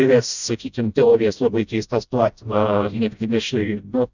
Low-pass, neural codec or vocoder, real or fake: 7.2 kHz; codec, 16 kHz, 0.5 kbps, FreqCodec, smaller model; fake